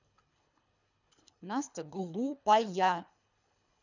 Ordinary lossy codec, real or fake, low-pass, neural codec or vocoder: none; fake; 7.2 kHz; codec, 24 kHz, 3 kbps, HILCodec